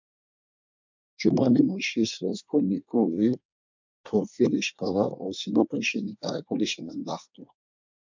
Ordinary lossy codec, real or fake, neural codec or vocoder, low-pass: MP3, 64 kbps; fake; codec, 24 kHz, 1 kbps, SNAC; 7.2 kHz